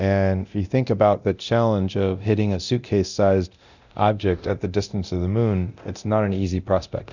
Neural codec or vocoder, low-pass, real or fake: codec, 24 kHz, 0.9 kbps, DualCodec; 7.2 kHz; fake